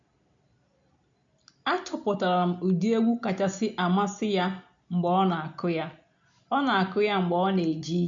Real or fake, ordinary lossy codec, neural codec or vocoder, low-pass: real; MP3, 64 kbps; none; 7.2 kHz